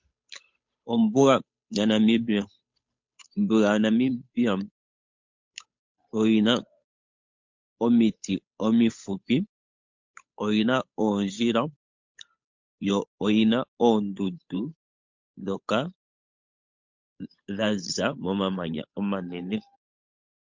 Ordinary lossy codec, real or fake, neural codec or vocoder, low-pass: MP3, 48 kbps; fake; codec, 16 kHz, 8 kbps, FunCodec, trained on Chinese and English, 25 frames a second; 7.2 kHz